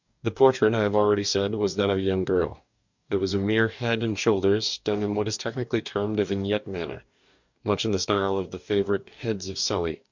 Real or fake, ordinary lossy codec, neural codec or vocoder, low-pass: fake; MP3, 64 kbps; codec, 44.1 kHz, 2.6 kbps, DAC; 7.2 kHz